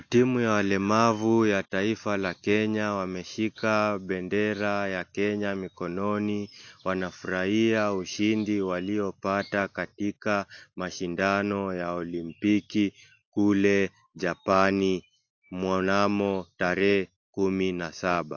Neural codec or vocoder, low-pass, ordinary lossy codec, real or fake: none; 7.2 kHz; AAC, 48 kbps; real